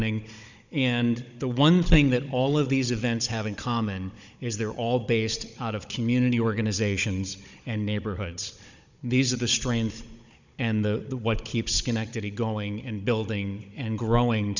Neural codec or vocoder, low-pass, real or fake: codec, 16 kHz, 16 kbps, FunCodec, trained on Chinese and English, 50 frames a second; 7.2 kHz; fake